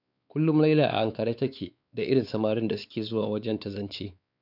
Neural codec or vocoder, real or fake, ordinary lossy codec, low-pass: codec, 16 kHz, 4 kbps, X-Codec, WavLM features, trained on Multilingual LibriSpeech; fake; none; 5.4 kHz